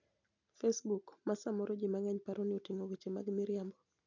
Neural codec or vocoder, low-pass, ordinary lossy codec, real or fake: none; 7.2 kHz; none; real